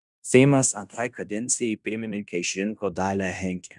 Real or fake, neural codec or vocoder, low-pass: fake; codec, 16 kHz in and 24 kHz out, 0.9 kbps, LongCat-Audio-Codec, fine tuned four codebook decoder; 10.8 kHz